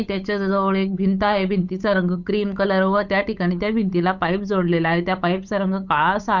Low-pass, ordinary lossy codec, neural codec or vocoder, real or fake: 7.2 kHz; none; codec, 16 kHz, 8 kbps, FunCodec, trained on Chinese and English, 25 frames a second; fake